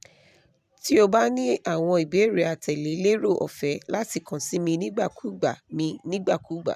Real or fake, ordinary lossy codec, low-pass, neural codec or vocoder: fake; none; 14.4 kHz; vocoder, 44.1 kHz, 128 mel bands every 512 samples, BigVGAN v2